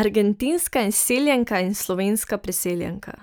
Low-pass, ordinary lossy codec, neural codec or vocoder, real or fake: none; none; none; real